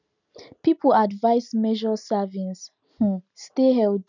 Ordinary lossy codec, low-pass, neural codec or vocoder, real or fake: none; 7.2 kHz; none; real